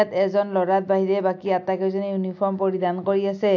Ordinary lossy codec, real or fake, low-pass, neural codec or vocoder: AAC, 48 kbps; real; 7.2 kHz; none